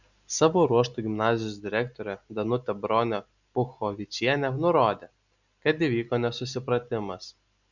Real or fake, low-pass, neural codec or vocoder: real; 7.2 kHz; none